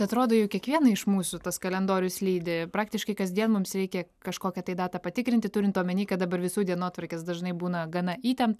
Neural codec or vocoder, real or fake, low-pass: none; real; 14.4 kHz